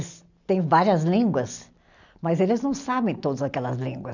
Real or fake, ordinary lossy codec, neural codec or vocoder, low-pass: real; none; none; 7.2 kHz